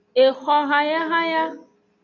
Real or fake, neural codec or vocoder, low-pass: real; none; 7.2 kHz